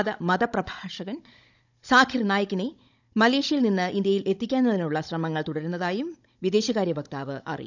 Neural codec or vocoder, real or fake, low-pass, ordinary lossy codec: codec, 16 kHz, 16 kbps, FunCodec, trained on Chinese and English, 50 frames a second; fake; 7.2 kHz; none